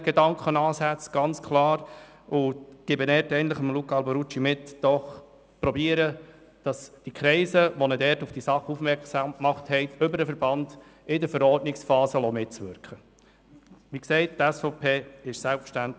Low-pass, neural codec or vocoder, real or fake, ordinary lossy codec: none; none; real; none